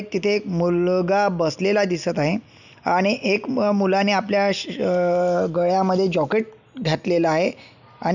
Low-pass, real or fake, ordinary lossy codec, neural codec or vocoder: 7.2 kHz; real; none; none